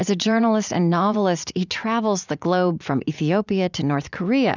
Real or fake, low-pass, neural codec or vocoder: fake; 7.2 kHz; vocoder, 44.1 kHz, 80 mel bands, Vocos